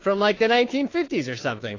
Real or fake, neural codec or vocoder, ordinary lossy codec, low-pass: fake; codec, 16 kHz, 2 kbps, FunCodec, trained on Chinese and English, 25 frames a second; AAC, 32 kbps; 7.2 kHz